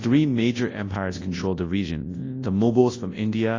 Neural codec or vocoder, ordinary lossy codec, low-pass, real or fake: codec, 24 kHz, 0.9 kbps, WavTokenizer, large speech release; AAC, 32 kbps; 7.2 kHz; fake